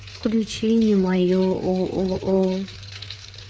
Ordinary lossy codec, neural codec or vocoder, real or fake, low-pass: none; codec, 16 kHz, 4 kbps, FreqCodec, larger model; fake; none